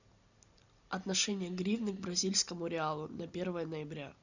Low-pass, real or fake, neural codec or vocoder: 7.2 kHz; real; none